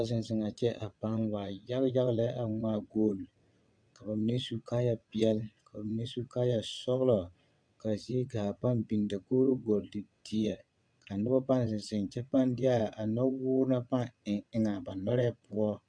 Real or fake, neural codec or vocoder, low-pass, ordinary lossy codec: fake; vocoder, 22.05 kHz, 80 mel bands, WaveNeXt; 9.9 kHz; MP3, 64 kbps